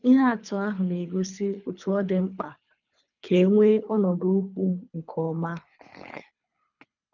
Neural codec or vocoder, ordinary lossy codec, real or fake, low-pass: codec, 24 kHz, 3 kbps, HILCodec; none; fake; 7.2 kHz